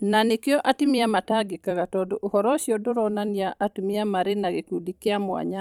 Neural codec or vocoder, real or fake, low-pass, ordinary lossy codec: vocoder, 44.1 kHz, 128 mel bands every 256 samples, BigVGAN v2; fake; 19.8 kHz; none